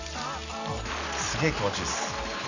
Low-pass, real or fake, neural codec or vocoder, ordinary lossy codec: 7.2 kHz; real; none; none